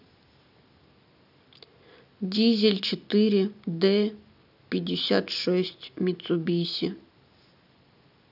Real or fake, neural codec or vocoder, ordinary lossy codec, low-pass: real; none; none; 5.4 kHz